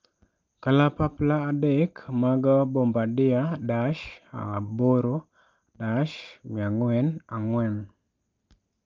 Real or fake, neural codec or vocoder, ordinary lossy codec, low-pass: real; none; Opus, 24 kbps; 7.2 kHz